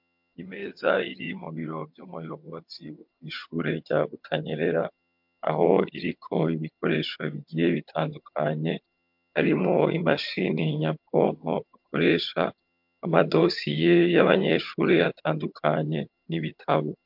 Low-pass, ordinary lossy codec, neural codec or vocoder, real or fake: 5.4 kHz; MP3, 48 kbps; vocoder, 22.05 kHz, 80 mel bands, HiFi-GAN; fake